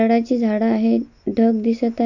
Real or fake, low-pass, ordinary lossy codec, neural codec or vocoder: real; 7.2 kHz; none; none